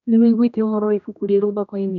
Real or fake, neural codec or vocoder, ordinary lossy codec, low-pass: fake; codec, 16 kHz, 1 kbps, X-Codec, HuBERT features, trained on general audio; none; 7.2 kHz